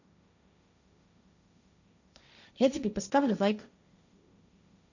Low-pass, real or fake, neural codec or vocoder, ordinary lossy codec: 7.2 kHz; fake; codec, 16 kHz, 1.1 kbps, Voila-Tokenizer; none